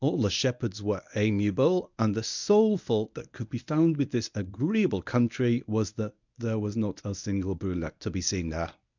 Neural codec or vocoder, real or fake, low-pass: codec, 24 kHz, 0.9 kbps, WavTokenizer, medium speech release version 1; fake; 7.2 kHz